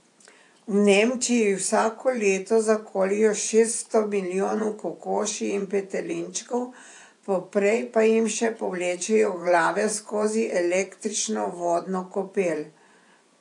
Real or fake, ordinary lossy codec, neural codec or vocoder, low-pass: fake; AAC, 64 kbps; vocoder, 24 kHz, 100 mel bands, Vocos; 10.8 kHz